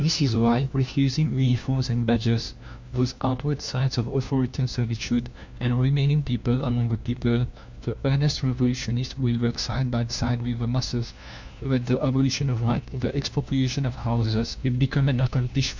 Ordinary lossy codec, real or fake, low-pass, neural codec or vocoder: MP3, 64 kbps; fake; 7.2 kHz; codec, 16 kHz, 1 kbps, FunCodec, trained on LibriTTS, 50 frames a second